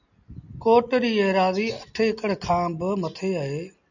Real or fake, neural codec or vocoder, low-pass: real; none; 7.2 kHz